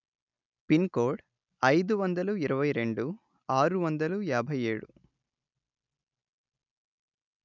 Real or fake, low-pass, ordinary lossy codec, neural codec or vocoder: real; 7.2 kHz; none; none